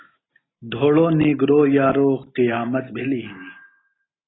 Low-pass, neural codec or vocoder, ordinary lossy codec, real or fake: 7.2 kHz; none; AAC, 16 kbps; real